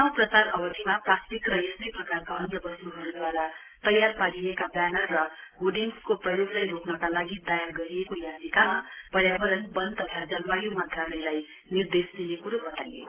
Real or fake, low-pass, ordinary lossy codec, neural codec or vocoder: real; 3.6 kHz; Opus, 16 kbps; none